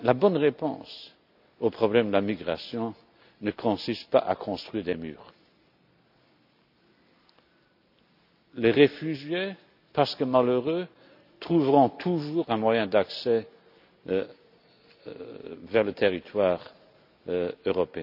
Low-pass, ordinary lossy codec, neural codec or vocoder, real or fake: 5.4 kHz; none; none; real